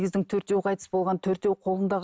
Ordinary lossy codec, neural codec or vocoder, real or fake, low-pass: none; none; real; none